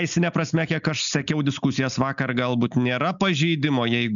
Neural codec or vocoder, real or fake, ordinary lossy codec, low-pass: none; real; MP3, 96 kbps; 7.2 kHz